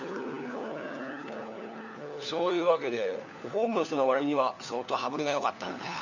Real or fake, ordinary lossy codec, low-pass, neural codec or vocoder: fake; none; 7.2 kHz; codec, 16 kHz, 4 kbps, FunCodec, trained on LibriTTS, 50 frames a second